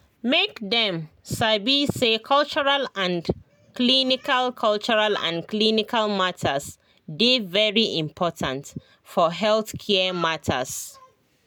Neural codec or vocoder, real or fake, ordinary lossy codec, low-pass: none; real; none; none